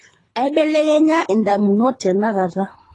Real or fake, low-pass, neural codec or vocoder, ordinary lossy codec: fake; 10.8 kHz; codec, 24 kHz, 3 kbps, HILCodec; AAC, 32 kbps